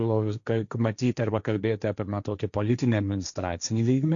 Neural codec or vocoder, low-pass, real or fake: codec, 16 kHz, 1.1 kbps, Voila-Tokenizer; 7.2 kHz; fake